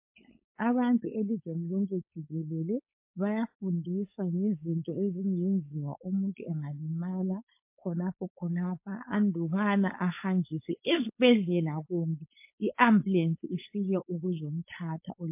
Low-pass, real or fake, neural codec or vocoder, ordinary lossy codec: 3.6 kHz; fake; codec, 16 kHz, 4.8 kbps, FACodec; MP3, 24 kbps